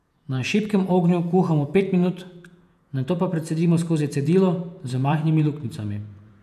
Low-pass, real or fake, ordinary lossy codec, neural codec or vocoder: 14.4 kHz; fake; none; vocoder, 48 kHz, 128 mel bands, Vocos